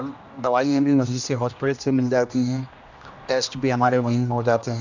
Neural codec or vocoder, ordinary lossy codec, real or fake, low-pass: codec, 16 kHz, 1 kbps, X-Codec, HuBERT features, trained on general audio; none; fake; 7.2 kHz